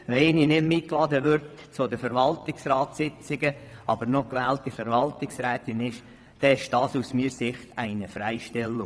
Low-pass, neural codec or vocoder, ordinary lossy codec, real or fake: none; vocoder, 22.05 kHz, 80 mel bands, WaveNeXt; none; fake